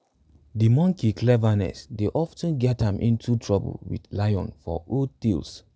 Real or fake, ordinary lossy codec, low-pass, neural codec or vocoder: real; none; none; none